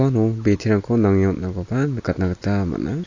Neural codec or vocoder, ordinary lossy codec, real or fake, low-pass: none; AAC, 48 kbps; real; 7.2 kHz